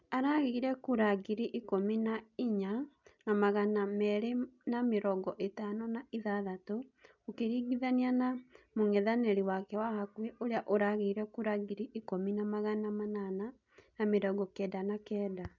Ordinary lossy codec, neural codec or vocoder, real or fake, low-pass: none; none; real; 7.2 kHz